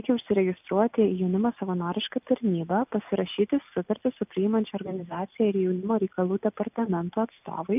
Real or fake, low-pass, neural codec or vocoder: real; 3.6 kHz; none